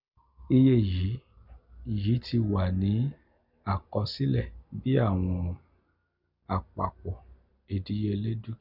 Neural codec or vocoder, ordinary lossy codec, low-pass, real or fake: none; none; 5.4 kHz; real